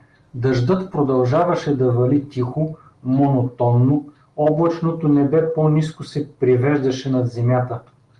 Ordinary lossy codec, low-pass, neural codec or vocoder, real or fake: Opus, 24 kbps; 10.8 kHz; none; real